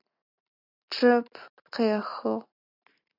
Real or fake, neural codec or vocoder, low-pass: real; none; 5.4 kHz